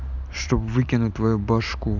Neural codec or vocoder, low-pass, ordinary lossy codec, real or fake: vocoder, 44.1 kHz, 128 mel bands every 512 samples, BigVGAN v2; 7.2 kHz; none; fake